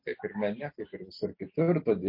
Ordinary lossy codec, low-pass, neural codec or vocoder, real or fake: MP3, 32 kbps; 5.4 kHz; none; real